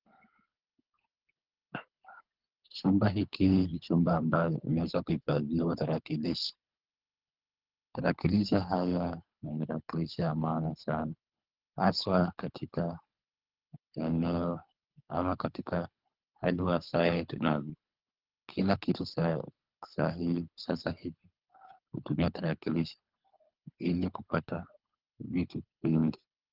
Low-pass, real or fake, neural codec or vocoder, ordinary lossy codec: 5.4 kHz; fake; codec, 24 kHz, 3 kbps, HILCodec; Opus, 24 kbps